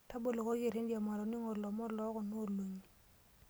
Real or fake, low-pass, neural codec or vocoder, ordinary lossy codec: real; none; none; none